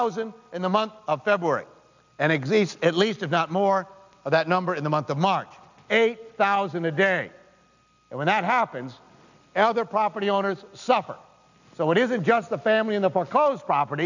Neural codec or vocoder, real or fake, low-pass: none; real; 7.2 kHz